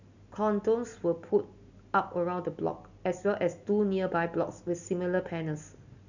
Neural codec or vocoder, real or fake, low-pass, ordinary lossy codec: none; real; 7.2 kHz; none